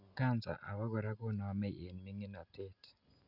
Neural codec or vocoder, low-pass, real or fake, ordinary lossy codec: none; 5.4 kHz; real; none